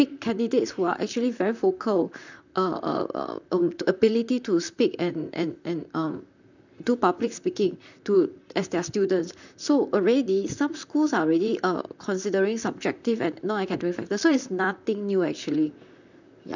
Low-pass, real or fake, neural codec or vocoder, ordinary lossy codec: 7.2 kHz; fake; vocoder, 44.1 kHz, 128 mel bands, Pupu-Vocoder; none